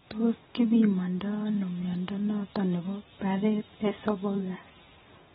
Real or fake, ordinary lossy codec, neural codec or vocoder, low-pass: real; AAC, 16 kbps; none; 10.8 kHz